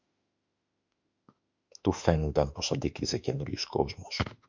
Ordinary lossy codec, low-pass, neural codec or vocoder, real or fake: MP3, 64 kbps; 7.2 kHz; autoencoder, 48 kHz, 32 numbers a frame, DAC-VAE, trained on Japanese speech; fake